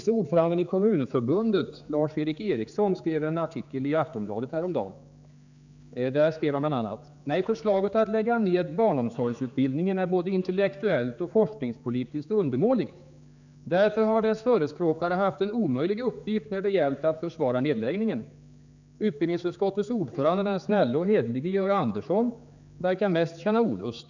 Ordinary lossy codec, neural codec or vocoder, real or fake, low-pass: none; codec, 16 kHz, 4 kbps, X-Codec, HuBERT features, trained on general audio; fake; 7.2 kHz